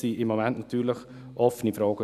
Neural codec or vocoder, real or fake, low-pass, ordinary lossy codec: none; real; 14.4 kHz; none